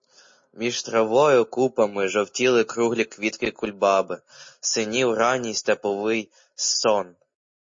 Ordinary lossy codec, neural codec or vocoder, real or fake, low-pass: MP3, 32 kbps; none; real; 7.2 kHz